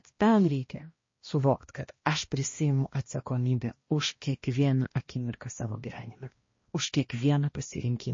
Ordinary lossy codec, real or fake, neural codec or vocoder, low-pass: MP3, 32 kbps; fake; codec, 16 kHz, 1 kbps, X-Codec, HuBERT features, trained on balanced general audio; 7.2 kHz